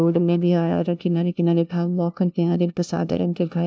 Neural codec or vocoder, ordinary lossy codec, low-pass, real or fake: codec, 16 kHz, 0.5 kbps, FunCodec, trained on LibriTTS, 25 frames a second; none; none; fake